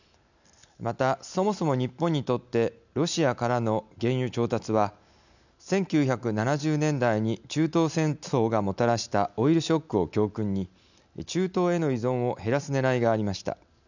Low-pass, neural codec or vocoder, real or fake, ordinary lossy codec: 7.2 kHz; none; real; none